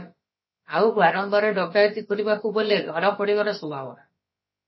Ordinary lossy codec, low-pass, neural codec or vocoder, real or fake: MP3, 24 kbps; 7.2 kHz; codec, 16 kHz, about 1 kbps, DyCAST, with the encoder's durations; fake